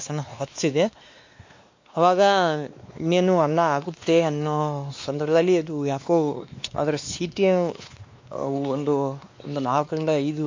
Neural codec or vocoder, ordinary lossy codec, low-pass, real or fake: codec, 16 kHz, 2 kbps, X-Codec, WavLM features, trained on Multilingual LibriSpeech; MP3, 48 kbps; 7.2 kHz; fake